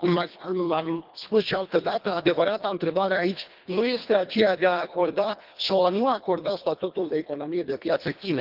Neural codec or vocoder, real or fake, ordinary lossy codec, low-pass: codec, 24 kHz, 1.5 kbps, HILCodec; fake; Opus, 32 kbps; 5.4 kHz